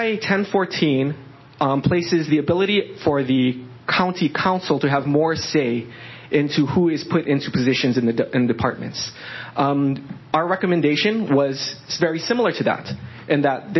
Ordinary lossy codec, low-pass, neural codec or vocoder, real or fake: MP3, 24 kbps; 7.2 kHz; none; real